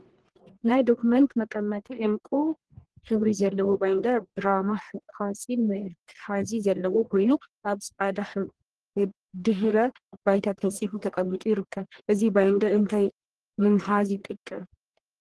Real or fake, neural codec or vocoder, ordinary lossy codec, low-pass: fake; codec, 44.1 kHz, 1.7 kbps, Pupu-Codec; Opus, 16 kbps; 10.8 kHz